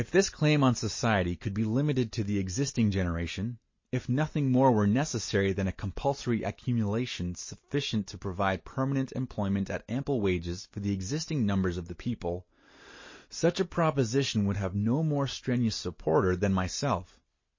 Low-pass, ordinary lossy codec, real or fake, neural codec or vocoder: 7.2 kHz; MP3, 32 kbps; real; none